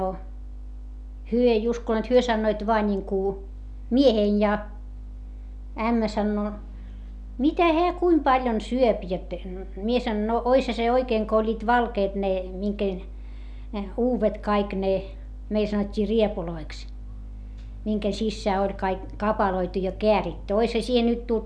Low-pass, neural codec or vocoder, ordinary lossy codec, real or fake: none; none; none; real